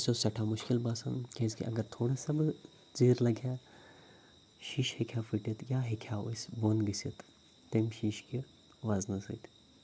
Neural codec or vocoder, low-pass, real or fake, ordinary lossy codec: none; none; real; none